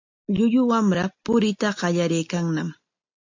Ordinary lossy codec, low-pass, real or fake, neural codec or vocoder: AAC, 48 kbps; 7.2 kHz; real; none